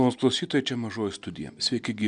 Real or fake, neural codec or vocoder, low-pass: real; none; 9.9 kHz